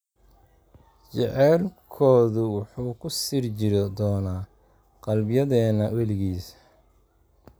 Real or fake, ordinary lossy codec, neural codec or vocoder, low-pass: real; none; none; none